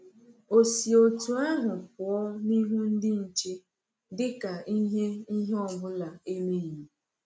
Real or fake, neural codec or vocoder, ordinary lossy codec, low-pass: real; none; none; none